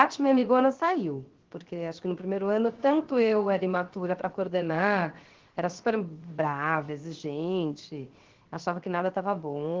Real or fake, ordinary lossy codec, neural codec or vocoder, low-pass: fake; Opus, 16 kbps; codec, 16 kHz, 0.7 kbps, FocalCodec; 7.2 kHz